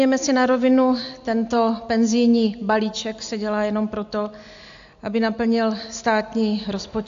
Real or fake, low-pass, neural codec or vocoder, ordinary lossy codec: real; 7.2 kHz; none; AAC, 64 kbps